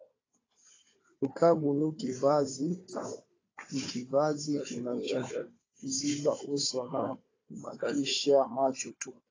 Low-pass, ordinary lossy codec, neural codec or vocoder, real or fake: 7.2 kHz; AAC, 32 kbps; codec, 16 kHz, 4 kbps, FunCodec, trained on Chinese and English, 50 frames a second; fake